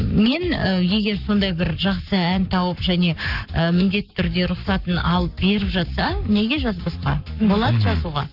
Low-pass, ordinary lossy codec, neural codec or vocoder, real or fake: 5.4 kHz; none; codec, 44.1 kHz, 7.8 kbps, Pupu-Codec; fake